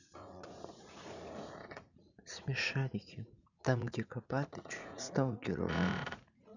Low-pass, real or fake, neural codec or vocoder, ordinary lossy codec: 7.2 kHz; fake; vocoder, 44.1 kHz, 80 mel bands, Vocos; none